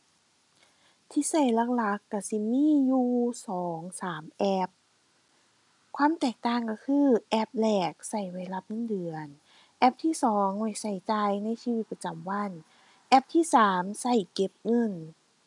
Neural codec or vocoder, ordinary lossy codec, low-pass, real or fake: none; none; 10.8 kHz; real